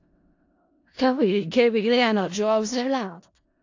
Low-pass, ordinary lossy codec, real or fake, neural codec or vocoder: 7.2 kHz; AAC, 48 kbps; fake; codec, 16 kHz in and 24 kHz out, 0.4 kbps, LongCat-Audio-Codec, four codebook decoder